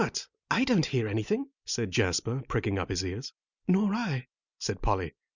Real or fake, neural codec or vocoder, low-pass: real; none; 7.2 kHz